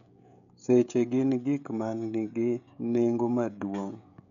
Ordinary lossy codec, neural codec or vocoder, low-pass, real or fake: none; codec, 16 kHz, 16 kbps, FreqCodec, smaller model; 7.2 kHz; fake